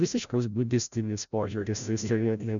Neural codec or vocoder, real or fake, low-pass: codec, 16 kHz, 0.5 kbps, FreqCodec, larger model; fake; 7.2 kHz